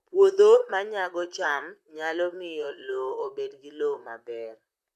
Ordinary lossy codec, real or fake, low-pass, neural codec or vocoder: MP3, 96 kbps; fake; 14.4 kHz; vocoder, 44.1 kHz, 128 mel bands, Pupu-Vocoder